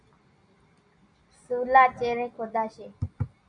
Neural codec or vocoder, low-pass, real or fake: none; 9.9 kHz; real